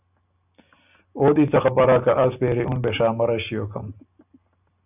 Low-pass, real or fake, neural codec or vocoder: 3.6 kHz; real; none